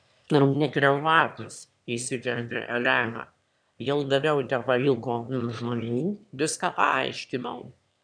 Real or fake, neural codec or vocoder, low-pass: fake; autoencoder, 22.05 kHz, a latent of 192 numbers a frame, VITS, trained on one speaker; 9.9 kHz